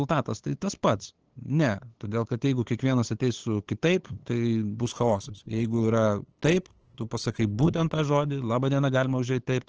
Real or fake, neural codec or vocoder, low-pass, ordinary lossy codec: fake; codec, 16 kHz, 8 kbps, FunCodec, trained on LibriTTS, 25 frames a second; 7.2 kHz; Opus, 16 kbps